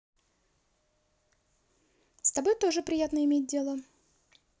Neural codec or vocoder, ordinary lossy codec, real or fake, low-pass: none; none; real; none